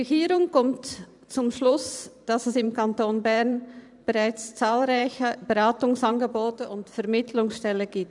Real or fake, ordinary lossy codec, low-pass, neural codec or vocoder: fake; none; 10.8 kHz; vocoder, 44.1 kHz, 128 mel bands every 512 samples, BigVGAN v2